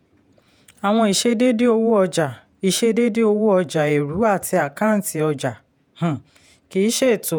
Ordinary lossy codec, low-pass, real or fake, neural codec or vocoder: none; none; fake; vocoder, 48 kHz, 128 mel bands, Vocos